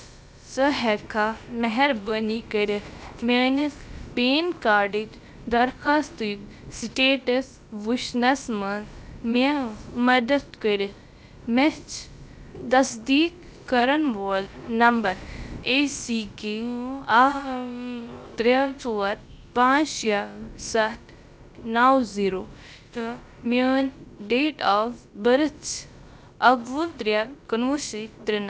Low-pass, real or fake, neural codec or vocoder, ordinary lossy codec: none; fake; codec, 16 kHz, about 1 kbps, DyCAST, with the encoder's durations; none